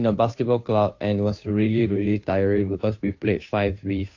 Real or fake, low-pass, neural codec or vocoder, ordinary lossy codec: fake; 7.2 kHz; codec, 16 kHz, 2 kbps, FunCodec, trained on Chinese and English, 25 frames a second; AAC, 48 kbps